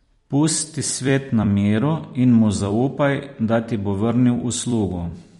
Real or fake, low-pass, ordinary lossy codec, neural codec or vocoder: fake; 19.8 kHz; MP3, 48 kbps; vocoder, 44.1 kHz, 128 mel bands every 256 samples, BigVGAN v2